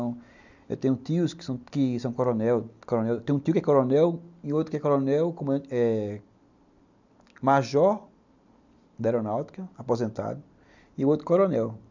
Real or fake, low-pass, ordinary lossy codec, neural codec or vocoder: real; 7.2 kHz; none; none